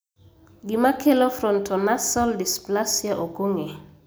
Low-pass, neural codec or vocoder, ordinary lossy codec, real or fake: none; none; none; real